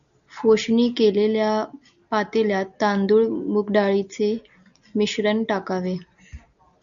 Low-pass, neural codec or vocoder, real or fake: 7.2 kHz; none; real